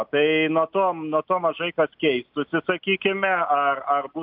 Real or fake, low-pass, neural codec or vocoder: real; 5.4 kHz; none